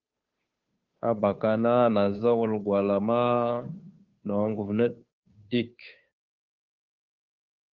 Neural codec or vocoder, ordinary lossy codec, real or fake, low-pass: codec, 16 kHz, 2 kbps, FunCodec, trained on Chinese and English, 25 frames a second; Opus, 32 kbps; fake; 7.2 kHz